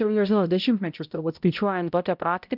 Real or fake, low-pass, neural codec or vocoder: fake; 5.4 kHz; codec, 16 kHz, 0.5 kbps, X-Codec, HuBERT features, trained on balanced general audio